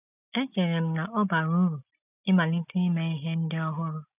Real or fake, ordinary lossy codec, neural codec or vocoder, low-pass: real; none; none; 3.6 kHz